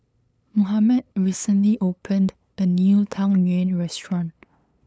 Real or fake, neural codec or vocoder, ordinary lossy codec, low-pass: fake; codec, 16 kHz, 8 kbps, FunCodec, trained on LibriTTS, 25 frames a second; none; none